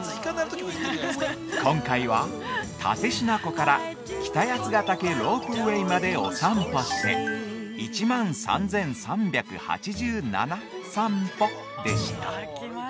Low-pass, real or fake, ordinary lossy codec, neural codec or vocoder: none; real; none; none